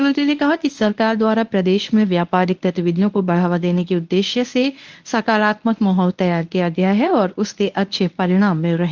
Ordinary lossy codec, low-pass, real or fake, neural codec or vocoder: Opus, 24 kbps; 7.2 kHz; fake; codec, 24 kHz, 0.9 kbps, WavTokenizer, medium speech release version 1